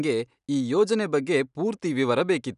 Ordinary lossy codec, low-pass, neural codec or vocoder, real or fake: none; 10.8 kHz; none; real